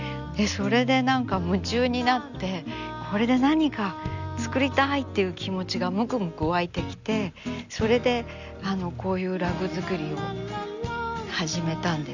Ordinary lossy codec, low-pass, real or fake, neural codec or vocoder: none; 7.2 kHz; real; none